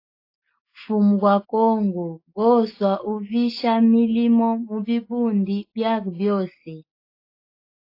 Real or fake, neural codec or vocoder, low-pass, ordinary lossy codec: real; none; 5.4 kHz; AAC, 32 kbps